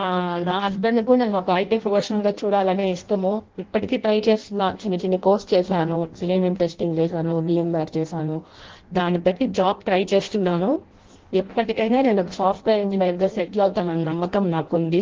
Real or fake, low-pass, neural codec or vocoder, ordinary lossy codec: fake; 7.2 kHz; codec, 16 kHz in and 24 kHz out, 0.6 kbps, FireRedTTS-2 codec; Opus, 16 kbps